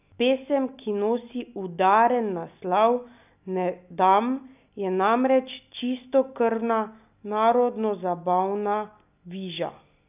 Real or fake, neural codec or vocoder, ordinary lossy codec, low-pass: real; none; none; 3.6 kHz